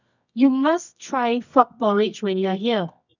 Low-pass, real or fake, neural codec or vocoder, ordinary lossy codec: 7.2 kHz; fake; codec, 24 kHz, 0.9 kbps, WavTokenizer, medium music audio release; none